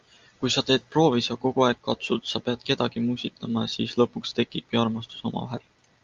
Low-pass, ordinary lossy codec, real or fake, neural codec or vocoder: 7.2 kHz; Opus, 32 kbps; real; none